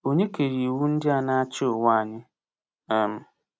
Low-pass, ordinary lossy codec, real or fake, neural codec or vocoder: none; none; real; none